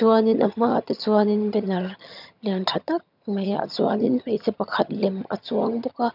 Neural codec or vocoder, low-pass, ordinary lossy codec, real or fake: vocoder, 22.05 kHz, 80 mel bands, HiFi-GAN; 5.4 kHz; none; fake